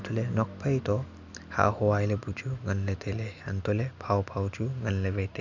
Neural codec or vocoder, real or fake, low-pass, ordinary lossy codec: none; real; 7.2 kHz; none